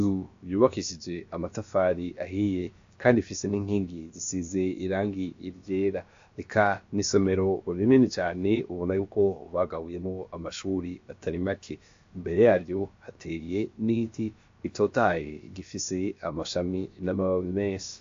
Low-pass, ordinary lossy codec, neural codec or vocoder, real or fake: 7.2 kHz; AAC, 48 kbps; codec, 16 kHz, about 1 kbps, DyCAST, with the encoder's durations; fake